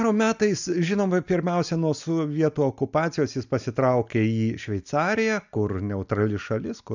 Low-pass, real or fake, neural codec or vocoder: 7.2 kHz; real; none